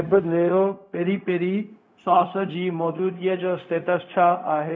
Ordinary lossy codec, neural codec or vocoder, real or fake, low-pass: none; codec, 16 kHz, 0.4 kbps, LongCat-Audio-Codec; fake; none